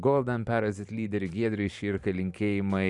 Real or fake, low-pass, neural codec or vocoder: fake; 10.8 kHz; vocoder, 44.1 kHz, 128 mel bands every 512 samples, BigVGAN v2